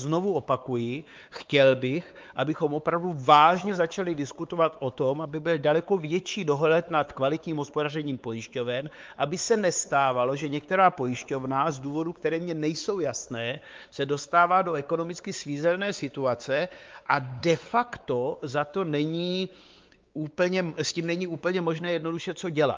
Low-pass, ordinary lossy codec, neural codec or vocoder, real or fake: 7.2 kHz; Opus, 24 kbps; codec, 16 kHz, 4 kbps, X-Codec, WavLM features, trained on Multilingual LibriSpeech; fake